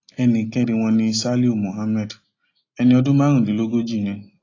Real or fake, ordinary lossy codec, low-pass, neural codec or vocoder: real; AAC, 32 kbps; 7.2 kHz; none